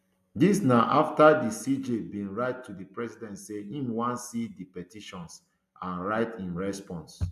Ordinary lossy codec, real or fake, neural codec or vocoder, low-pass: AAC, 96 kbps; real; none; 14.4 kHz